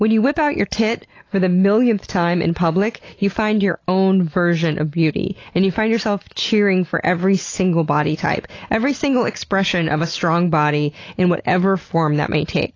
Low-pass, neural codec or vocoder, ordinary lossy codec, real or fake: 7.2 kHz; none; AAC, 32 kbps; real